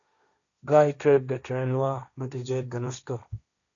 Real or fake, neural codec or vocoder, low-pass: fake; codec, 16 kHz, 1.1 kbps, Voila-Tokenizer; 7.2 kHz